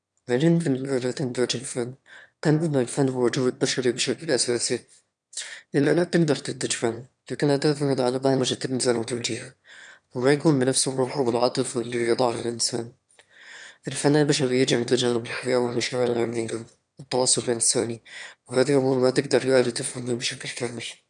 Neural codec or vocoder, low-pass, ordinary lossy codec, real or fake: autoencoder, 22.05 kHz, a latent of 192 numbers a frame, VITS, trained on one speaker; 9.9 kHz; none; fake